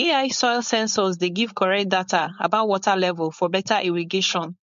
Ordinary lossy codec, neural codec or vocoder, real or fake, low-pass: MP3, 48 kbps; codec, 16 kHz, 4.8 kbps, FACodec; fake; 7.2 kHz